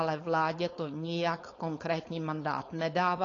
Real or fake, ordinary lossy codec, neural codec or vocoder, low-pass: fake; AAC, 32 kbps; codec, 16 kHz, 4.8 kbps, FACodec; 7.2 kHz